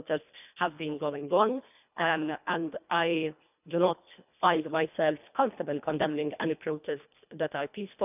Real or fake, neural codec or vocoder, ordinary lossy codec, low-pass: fake; codec, 24 kHz, 1.5 kbps, HILCodec; none; 3.6 kHz